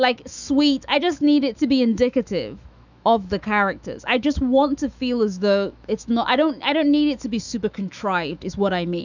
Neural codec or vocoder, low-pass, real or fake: autoencoder, 48 kHz, 128 numbers a frame, DAC-VAE, trained on Japanese speech; 7.2 kHz; fake